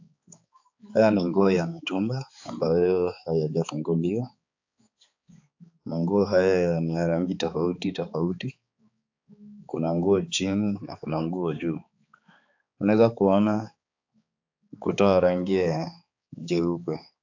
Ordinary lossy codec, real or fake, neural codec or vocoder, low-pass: AAC, 48 kbps; fake; codec, 16 kHz, 4 kbps, X-Codec, HuBERT features, trained on balanced general audio; 7.2 kHz